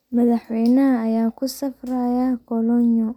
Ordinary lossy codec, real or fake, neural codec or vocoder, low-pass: none; real; none; 19.8 kHz